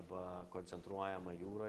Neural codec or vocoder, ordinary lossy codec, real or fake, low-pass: none; Opus, 16 kbps; real; 14.4 kHz